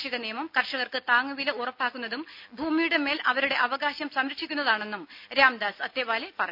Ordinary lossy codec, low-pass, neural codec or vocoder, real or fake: none; 5.4 kHz; none; real